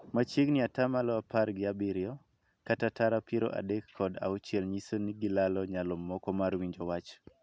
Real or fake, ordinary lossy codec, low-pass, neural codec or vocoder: real; none; none; none